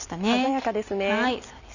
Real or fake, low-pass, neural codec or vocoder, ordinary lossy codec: real; 7.2 kHz; none; none